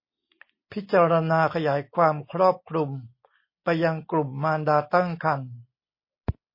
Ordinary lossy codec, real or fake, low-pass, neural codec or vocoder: MP3, 24 kbps; real; 5.4 kHz; none